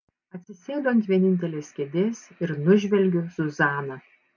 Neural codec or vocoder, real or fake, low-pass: none; real; 7.2 kHz